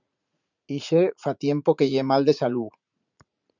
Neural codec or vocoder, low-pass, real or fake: vocoder, 44.1 kHz, 80 mel bands, Vocos; 7.2 kHz; fake